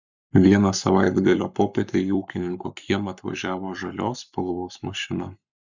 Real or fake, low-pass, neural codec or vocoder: fake; 7.2 kHz; codec, 44.1 kHz, 7.8 kbps, Pupu-Codec